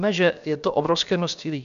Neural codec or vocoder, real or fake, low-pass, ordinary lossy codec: codec, 16 kHz, about 1 kbps, DyCAST, with the encoder's durations; fake; 7.2 kHz; AAC, 96 kbps